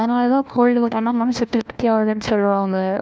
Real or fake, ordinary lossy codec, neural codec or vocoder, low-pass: fake; none; codec, 16 kHz, 1 kbps, FunCodec, trained on LibriTTS, 50 frames a second; none